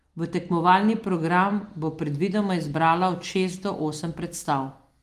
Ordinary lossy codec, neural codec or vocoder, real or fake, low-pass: Opus, 24 kbps; none; real; 14.4 kHz